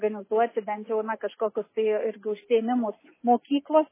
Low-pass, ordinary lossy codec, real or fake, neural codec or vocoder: 3.6 kHz; MP3, 16 kbps; real; none